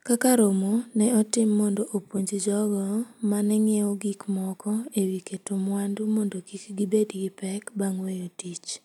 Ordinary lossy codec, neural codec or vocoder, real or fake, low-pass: none; none; real; 19.8 kHz